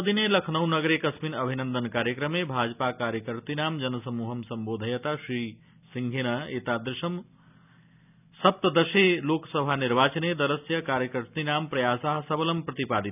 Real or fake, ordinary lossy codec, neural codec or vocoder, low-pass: real; none; none; 3.6 kHz